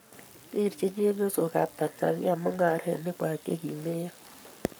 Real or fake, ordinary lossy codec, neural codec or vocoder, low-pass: fake; none; codec, 44.1 kHz, 7.8 kbps, Pupu-Codec; none